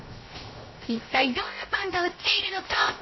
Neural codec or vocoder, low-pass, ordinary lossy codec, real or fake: codec, 16 kHz, 0.3 kbps, FocalCodec; 7.2 kHz; MP3, 24 kbps; fake